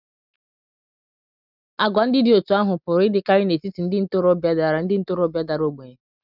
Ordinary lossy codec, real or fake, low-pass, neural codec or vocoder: none; real; 5.4 kHz; none